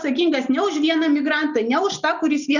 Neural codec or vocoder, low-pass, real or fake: none; 7.2 kHz; real